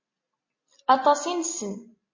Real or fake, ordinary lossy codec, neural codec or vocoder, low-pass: real; MP3, 32 kbps; none; 7.2 kHz